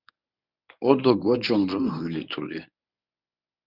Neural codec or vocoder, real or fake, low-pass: codec, 24 kHz, 0.9 kbps, WavTokenizer, medium speech release version 1; fake; 5.4 kHz